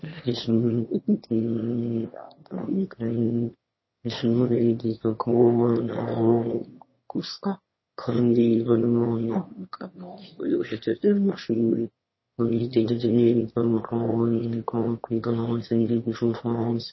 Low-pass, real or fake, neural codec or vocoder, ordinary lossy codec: 7.2 kHz; fake; autoencoder, 22.05 kHz, a latent of 192 numbers a frame, VITS, trained on one speaker; MP3, 24 kbps